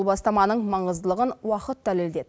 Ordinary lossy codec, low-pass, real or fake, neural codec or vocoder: none; none; real; none